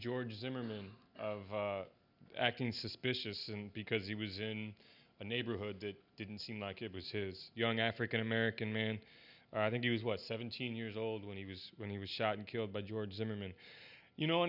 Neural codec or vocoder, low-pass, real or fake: none; 5.4 kHz; real